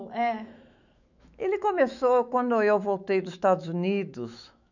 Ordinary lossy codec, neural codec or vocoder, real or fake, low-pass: none; autoencoder, 48 kHz, 128 numbers a frame, DAC-VAE, trained on Japanese speech; fake; 7.2 kHz